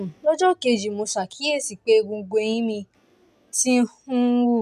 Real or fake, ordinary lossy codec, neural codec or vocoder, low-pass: real; none; none; 14.4 kHz